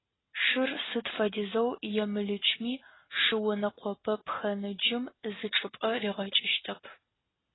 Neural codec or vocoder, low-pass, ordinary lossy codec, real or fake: none; 7.2 kHz; AAC, 16 kbps; real